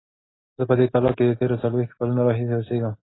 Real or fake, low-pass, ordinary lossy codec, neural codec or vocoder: real; 7.2 kHz; AAC, 16 kbps; none